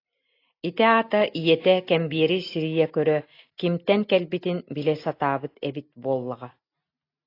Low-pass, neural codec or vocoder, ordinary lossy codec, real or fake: 5.4 kHz; none; AAC, 32 kbps; real